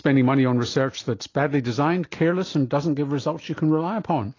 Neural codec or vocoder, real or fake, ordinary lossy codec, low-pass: none; real; AAC, 32 kbps; 7.2 kHz